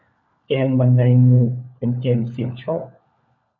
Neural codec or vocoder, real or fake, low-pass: codec, 16 kHz, 4 kbps, FunCodec, trained on LibriTTS, 50 frames a second; fake; 7.2 kHz